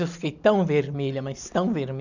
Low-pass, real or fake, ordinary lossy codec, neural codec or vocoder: 7.2 kHz; real; none; none